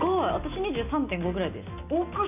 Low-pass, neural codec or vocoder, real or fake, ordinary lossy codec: 3.6 kHz; none; real; none